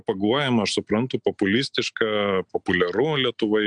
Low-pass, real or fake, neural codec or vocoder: 9.9 kHz; real; none